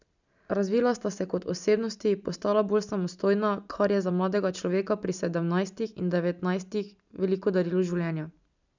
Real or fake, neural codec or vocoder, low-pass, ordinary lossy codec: real; none; 7.2 kHz; none